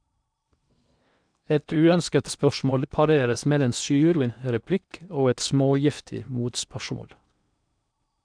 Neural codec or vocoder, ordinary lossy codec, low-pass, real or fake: codec, 16 kHz in and 24 kHz out, 0.8 kbps, FocalCodec, streaming, 65536 codes; none; 9.9 kHz; fake